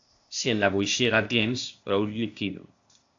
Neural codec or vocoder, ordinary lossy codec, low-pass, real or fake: codec, 16 kHz, 0.8 kbps, ZipCodec; AAC, 64 kbps; 7.2 kHz; fake